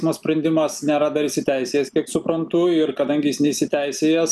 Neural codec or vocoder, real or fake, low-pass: none; real; 14.4 kHz